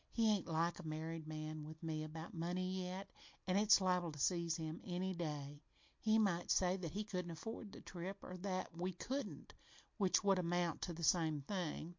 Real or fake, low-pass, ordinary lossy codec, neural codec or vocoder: real; 7.2 kHz; MP3, 48 kbps; none